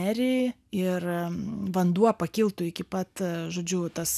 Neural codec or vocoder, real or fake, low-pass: none; real; 14.4 kHz